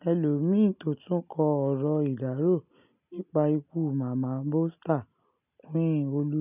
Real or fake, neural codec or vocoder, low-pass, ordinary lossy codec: real; none; 3.6 kHz; none